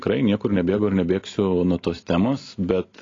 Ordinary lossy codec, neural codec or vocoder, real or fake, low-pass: AAC, 32 kbps; none; real; 7.2 kHz